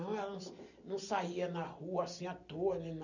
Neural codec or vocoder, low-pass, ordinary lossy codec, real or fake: none; 7.2 kHz; none; real